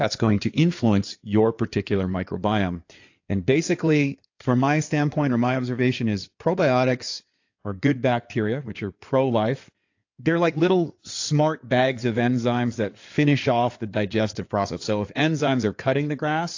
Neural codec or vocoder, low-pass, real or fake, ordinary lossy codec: codec, 16 kHz in and 24 kHz out, 2.2 kbps, FireRedTTS-2 codec; 7.2 kHz; fake; AAC, 48 kbps